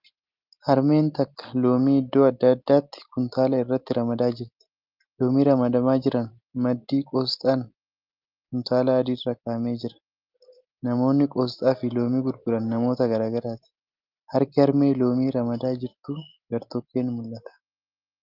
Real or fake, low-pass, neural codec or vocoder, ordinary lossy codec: real; 5.4 kHz; none; Opus, 32 kbps